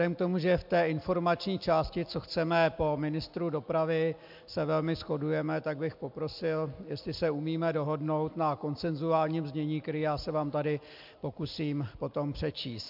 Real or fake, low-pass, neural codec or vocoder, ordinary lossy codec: real; 5.4 kHz; none; MP3, 48 kbps